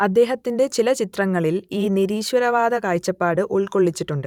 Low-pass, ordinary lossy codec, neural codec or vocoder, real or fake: 19.8 kHz; none; vocoder, 44.1 kHz, 128 mel bands every 512 samples, BigVGAN v2; fake